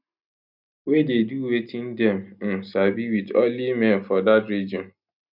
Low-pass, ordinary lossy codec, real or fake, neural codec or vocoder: 5.4 kHz; none; real; none